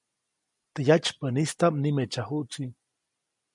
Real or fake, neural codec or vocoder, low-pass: real; none; 10.8 kHz